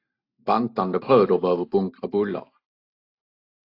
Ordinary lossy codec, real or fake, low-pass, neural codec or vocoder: AAC, 32 kbps; real; 5.4 kHz; none